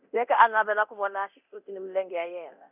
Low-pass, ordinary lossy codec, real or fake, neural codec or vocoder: 3.6 kHz; none; fake; codec, 24 kHz, 0.9 kbps, DualCodec